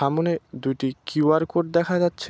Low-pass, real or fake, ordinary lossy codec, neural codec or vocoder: none; real; none; none